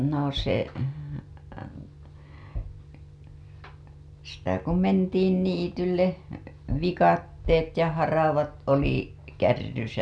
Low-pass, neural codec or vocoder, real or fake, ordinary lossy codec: none; none; real; none